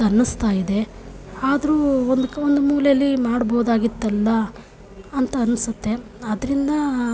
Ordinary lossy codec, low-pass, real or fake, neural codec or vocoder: none; none; real; none